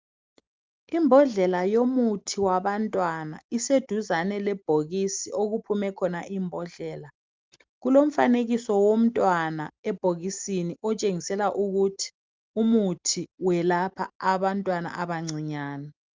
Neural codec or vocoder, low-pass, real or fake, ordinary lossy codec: none; 7.2 kHz; real; Opus, 24 kbps